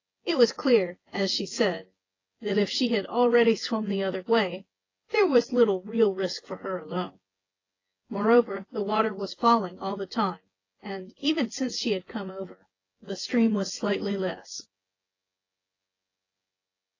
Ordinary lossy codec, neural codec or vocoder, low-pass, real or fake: AAC, 32 kbps; vocoder, 24 kHz, 100 mel bands, Vocos; 7.2 kHz; fake